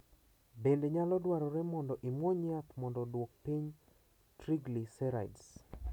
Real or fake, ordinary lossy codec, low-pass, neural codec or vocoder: real; none; 19.8 kHz; none